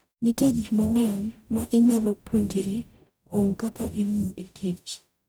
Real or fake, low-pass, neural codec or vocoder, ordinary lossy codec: fake; none; codec, 44.1 kHz, 0.9 kbps, DAC; none